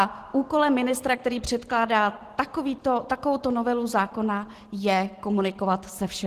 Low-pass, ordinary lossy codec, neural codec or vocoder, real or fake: 14.4 kHz; Opus, 16 kbps; none; real